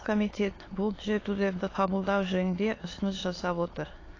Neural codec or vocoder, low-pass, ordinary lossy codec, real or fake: autoencoder, 22.05 kHz, a latent of 192 numbers a frame, VITS, trained on many speakers; 7.2 kHz; AAC, 32 kbps; fake